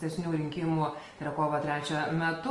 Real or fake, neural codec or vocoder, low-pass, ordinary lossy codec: real; none; 10.8 kHz; Opus, 24 kbps